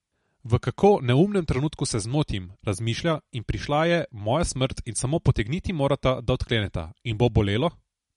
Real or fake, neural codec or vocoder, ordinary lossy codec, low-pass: real; none; MP3, 48 kbps; 19.8 kHz